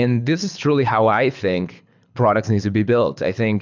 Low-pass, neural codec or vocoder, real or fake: 7.2 kHz; codec, 24 kHz, 6 kbps, HILCodec; fake